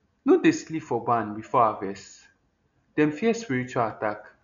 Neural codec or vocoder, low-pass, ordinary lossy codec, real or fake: none; 7.2 kHz; none; real